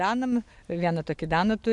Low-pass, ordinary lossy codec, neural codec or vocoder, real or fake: 10.8 kHz; MP3, 64 kbps; none; real